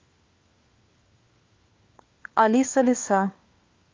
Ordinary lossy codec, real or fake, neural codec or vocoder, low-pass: Opus, 32 kbps; fake; codec, 16 kHz, 4 kbps, FunCodec, trained on LibriTTS, 50 frames a second; 7.2 kHz